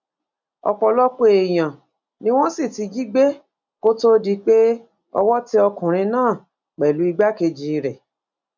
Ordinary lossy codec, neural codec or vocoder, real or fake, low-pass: none; none; real; 7.2 kHz